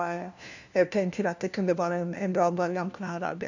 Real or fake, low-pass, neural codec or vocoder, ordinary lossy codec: fake; 7.2 kHz; codec, 16 kHz, 1 kbps, FunCodec, trained on LibriTTS, 50 frames a second; none